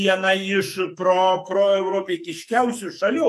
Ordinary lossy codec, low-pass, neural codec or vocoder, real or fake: AAC, 96 kbps; 14.4 kHz; codec, 44.1 kHz, 2.6 kbps, SNAC; fake